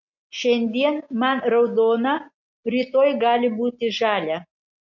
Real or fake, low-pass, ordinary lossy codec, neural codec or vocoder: real; 7.2 kHz; MP3, 48 kbps; none